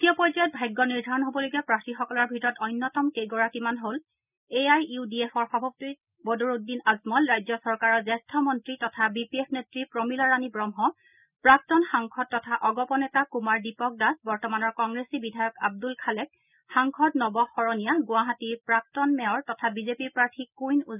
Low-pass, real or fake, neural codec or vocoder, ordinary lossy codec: 3.6 kHz; real; none; none